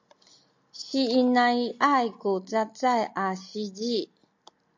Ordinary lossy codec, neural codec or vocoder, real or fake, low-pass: MP3, 48 kbps; none; real; 7.2 kHz